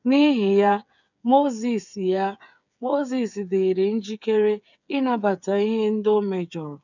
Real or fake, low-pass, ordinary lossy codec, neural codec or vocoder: fake; 7.2 kHz; none; codec, 16 kHz, 8 kbps, FreqCodec, smaller model